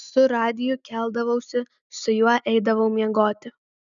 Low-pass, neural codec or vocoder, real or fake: 7.2 kHz; none; real